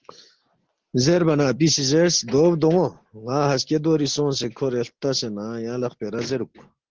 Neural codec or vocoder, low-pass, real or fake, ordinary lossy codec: none; 7.2 kHz; real; Opus, 16 kbps